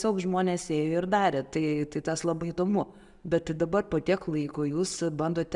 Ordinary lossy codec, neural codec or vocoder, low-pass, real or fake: Opus, 64 kbps; none; 10.8 kHz; real